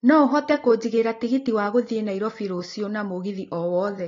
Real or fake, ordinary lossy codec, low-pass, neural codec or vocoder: real; AAC, 32 kbps; 7.2 kHz; none